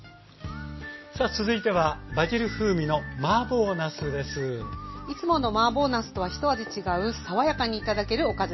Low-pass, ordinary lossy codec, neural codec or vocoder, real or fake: 7.2 kHz; MP3, 24 kbps; none; real